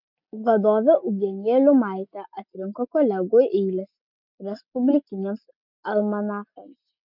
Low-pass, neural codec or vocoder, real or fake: 5.4 kHz; none; real